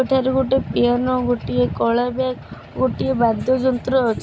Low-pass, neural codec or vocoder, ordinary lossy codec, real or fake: none; none; none; real